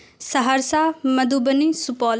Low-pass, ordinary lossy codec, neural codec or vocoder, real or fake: none; none; none; real